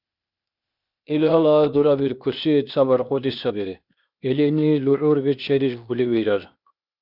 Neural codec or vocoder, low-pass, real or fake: codec, 16 kHz, 0.8 kbps, ZipCodec; 5.4 kHz; fake